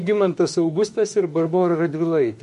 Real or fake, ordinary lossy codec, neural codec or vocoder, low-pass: fake; MP3, 48 kbps; autoencoder, 48 kHz, 32 numbers a frame, DAC-VAE, trained on Japanese speech; 14.4 kHz